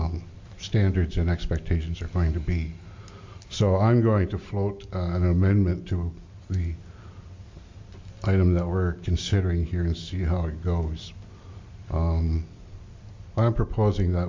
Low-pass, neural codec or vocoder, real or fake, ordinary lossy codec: 7.2 kHz; none; real; MP3, 48 kbps